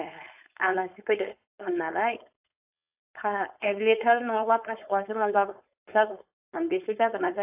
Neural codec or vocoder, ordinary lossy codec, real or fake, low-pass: codec, 16 kHz, 4.8 kbps, FACodec; AAC, 32 kbps; fake; 3.6 kHz